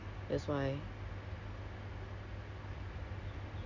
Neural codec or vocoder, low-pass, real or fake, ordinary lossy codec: none; 7.2 kHz; real; Opus, 64 kbps